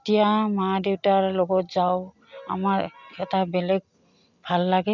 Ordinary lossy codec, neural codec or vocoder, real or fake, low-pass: none; none; real; 7.2 kHz